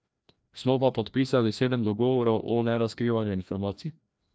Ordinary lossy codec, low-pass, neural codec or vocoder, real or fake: none; none; codec, 16 kHz, 1 kbps, FreqCodec, larger model; fake